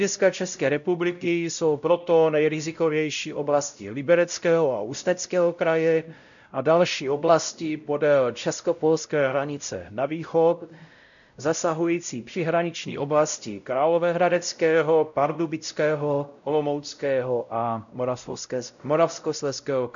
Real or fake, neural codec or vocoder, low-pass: fake; codec, 16 kHz, 0.5 kbps, X-Codec, WavLM features, trained on Multilingual LibriSpeech; 7.2 kHz